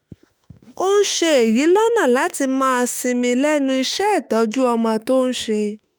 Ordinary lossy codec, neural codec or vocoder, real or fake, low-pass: none; autoencoder, 48 kHz, 32 numbers a frame, DAC-VAE, trained on Japanese speech; fake; none